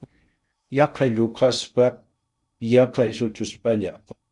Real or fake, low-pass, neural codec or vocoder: fake; 10.8 kHz; codec, 16 kHz in and 24 kHz out, 0.6 kbps, FocalCodec, streaming, 2048 codes